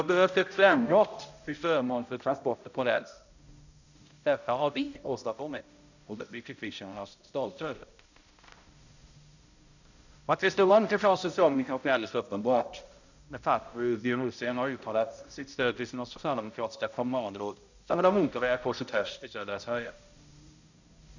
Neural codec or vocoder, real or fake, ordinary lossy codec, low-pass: codec, 16 kHz, 0.5 kbps, X-Codec, HuBERT features, trained on balanced general audio; fake; none; 7.2 kHz